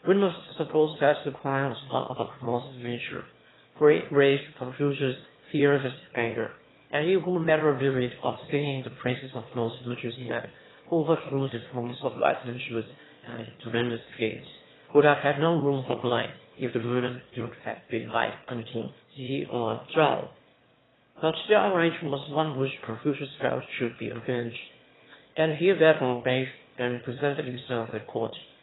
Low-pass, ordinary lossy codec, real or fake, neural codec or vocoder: 7.2 kHz; AAC, 16 kbps; fake; autoencoder, 22.05 kHz, a latent of 192 numbers a frame, VITS, trained on one speaker